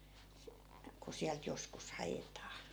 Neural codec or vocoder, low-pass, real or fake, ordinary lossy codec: none; none; real; none